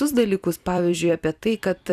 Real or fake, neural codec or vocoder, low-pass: fake; vocoder, 44.1 kHz, 128 mel bands every 256 samples, BigVGAN v2; 14.4 kHz